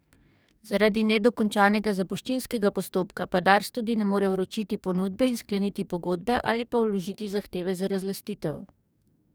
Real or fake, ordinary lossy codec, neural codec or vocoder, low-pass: fake; none; codec, 44.1 kHz, 2.6 kbps, DAC; none